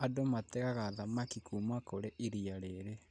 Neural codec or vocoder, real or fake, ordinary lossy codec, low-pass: none; real; none; 9.9 kHz